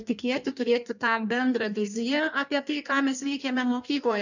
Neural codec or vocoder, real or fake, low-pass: codec, 16 kHz in and 24 kHz out, 1.1 kbps, FireRedTTS-2 codec; fake; 7.2 kHz